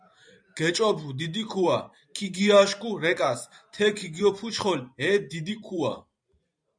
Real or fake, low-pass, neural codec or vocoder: fake; 9.9 kHz; vocoder, 44.1 kHz, 128 mel bands every 512 samples, BigVGAN v2